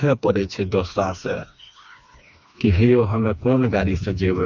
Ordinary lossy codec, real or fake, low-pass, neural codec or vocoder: none; fake; 7.2 kHz; codec, 16 kHz, 2 kbps, FreqCodec, smaller model